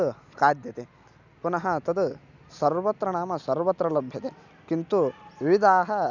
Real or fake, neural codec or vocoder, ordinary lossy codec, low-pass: real; none; none; 7.2 kHz